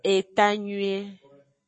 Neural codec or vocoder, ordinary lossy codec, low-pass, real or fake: codec, 44.1 kHz, 7.8 kbps, Pupu-Codec; MP3, 32 kbps; 9.9 kHz; fake